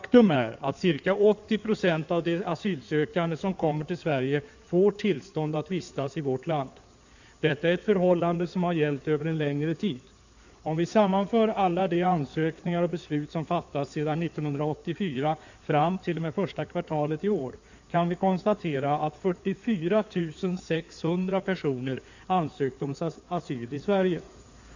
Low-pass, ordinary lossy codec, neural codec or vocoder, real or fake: 7.2 kHz; none; codec, 16 kHz in and 24 kHz out, 2.2 kbps, FireRedTTS-2 codec; fake